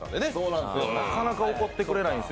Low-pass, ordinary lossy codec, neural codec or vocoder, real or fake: none; none; none; real